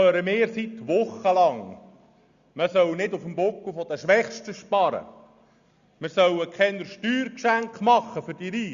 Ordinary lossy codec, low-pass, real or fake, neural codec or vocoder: Opus, 64 kbps; 7.2 kHz; real; none